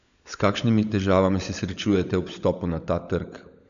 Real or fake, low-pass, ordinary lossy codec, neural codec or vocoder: fake; 7.2 kHz; AAC, 96 kbps; codec, 16 kHz, 16 kbps, FunCodec, trained on LibriTTS, 50 frames a second